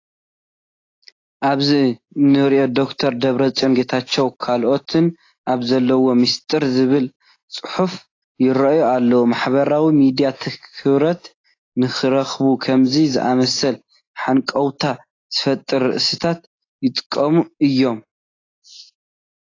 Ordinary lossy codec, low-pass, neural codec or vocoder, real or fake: AAC, 32 kbps; 7.2 kHz; none; real